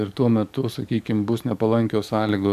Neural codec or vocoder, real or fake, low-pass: codec, 44.1 kHz, 7.8 kbps, DAC; fake; 14.4 kHz